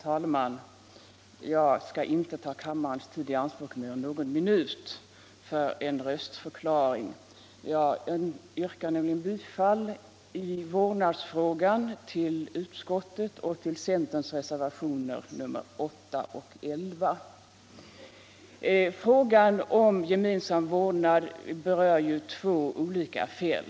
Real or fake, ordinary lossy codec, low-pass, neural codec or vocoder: real; none; none; none